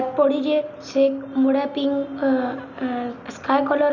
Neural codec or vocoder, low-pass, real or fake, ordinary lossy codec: none; 7.2 kHz; real; none